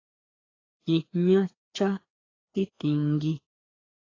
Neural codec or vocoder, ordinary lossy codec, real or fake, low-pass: codec, 16 kHz, 2 kbps, FreqCodec, larger model; AAC, 32 kbps; fake; 7.2 kHz